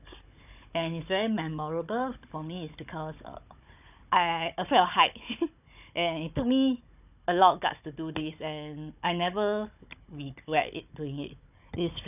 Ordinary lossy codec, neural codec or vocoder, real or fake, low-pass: none; codec, 16 kHz, 16 kbps, FunCodec, trained on Chinese and English, 50 frames a second; fake; 3.6 kHz